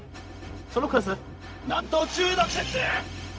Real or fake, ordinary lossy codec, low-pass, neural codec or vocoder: fake; none; none; codec, 16 kHz, 0.4 kbps, LongCat-Audio-Codec